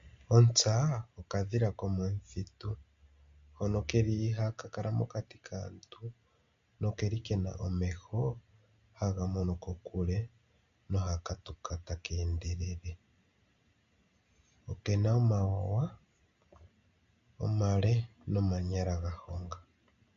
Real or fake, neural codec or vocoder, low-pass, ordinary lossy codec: real; none; 7.2 kHz; MP3, 48 kbps